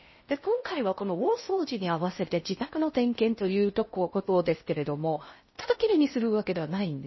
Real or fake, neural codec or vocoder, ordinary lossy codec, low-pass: fake; codec, 16 kHz in and 24 kHz out, 0.6 kbps, FocalCodec, streaming, 4096 codes; MP3, 24 kbps; 7.2 kHz